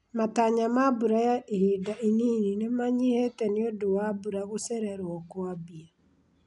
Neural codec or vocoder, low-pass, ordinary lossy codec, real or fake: none; 10.8 kHz; none; real